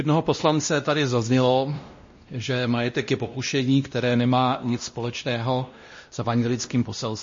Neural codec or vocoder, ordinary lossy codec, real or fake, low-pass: codec, 16 kHz, 1 kbps, X-Codec, WavLM features, trained on Multilingual LibriSpeech; MP3, 32 kbps; fake; 7.2 kHz